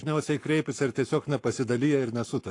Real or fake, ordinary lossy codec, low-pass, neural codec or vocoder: fake; AAC, 48 kbps; 10.8 kHz; codec, 44.1 kHz, 7.8 kbps, Pupu-Codec